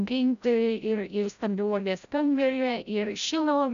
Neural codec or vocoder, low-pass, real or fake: codec, 16 kHz, 0.5 kbps, FreqCodec, larger model; 7.2 kHz; fake